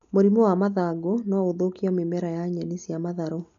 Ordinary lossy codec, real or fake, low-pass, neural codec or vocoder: none; real; 7.2 kHz; none